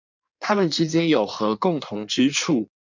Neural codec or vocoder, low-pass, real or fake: codec, 16 kHz in and 24 kHz out, 1.1 kbps, FireRedTTS-2 codec; 7.2 kHz; fake